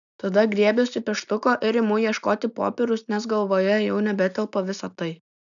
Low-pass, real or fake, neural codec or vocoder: 7.2 kHz; real; none